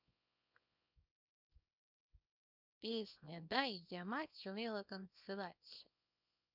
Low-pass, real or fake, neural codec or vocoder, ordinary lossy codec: 5.4 kHz; fake; codec, 24 kHz, 0.9 kbps, WavTokenizer, small release; none